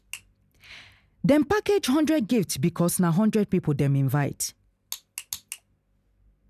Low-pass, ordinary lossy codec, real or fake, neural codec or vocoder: 14.4 kHz; none; real; none